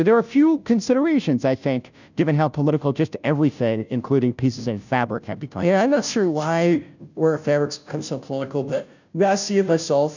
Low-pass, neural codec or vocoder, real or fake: 7.2 kHz; codec, 16 kHz, 0.5 kbps, FunCodec, trained on Chinese and English, 25 frames a second; fake